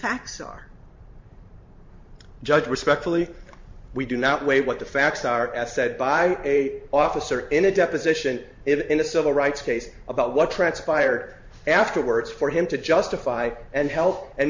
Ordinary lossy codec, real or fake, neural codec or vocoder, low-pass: MP3, 48 kbps; fake; vocoder, 44.1 kHz, 128 mel bands every 512 samples, BigVGAN v2; 7.2 kHz